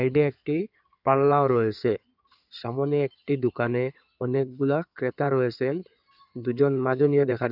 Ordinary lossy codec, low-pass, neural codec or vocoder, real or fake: none; 5.4 kHz; codec, 16 kHz in and 24 kHz out, 2.2 kbps, FireRedTTS-2 codec; fake